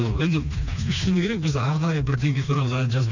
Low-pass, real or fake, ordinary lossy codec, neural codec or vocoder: 7.2 kHz; fake; none; codec, 16 kHz, 2 kbps, FreqCodec, smaller model